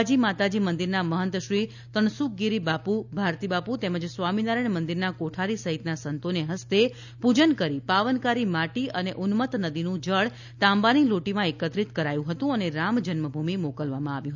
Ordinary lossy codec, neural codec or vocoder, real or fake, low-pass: none; none; real; 7.2 kHz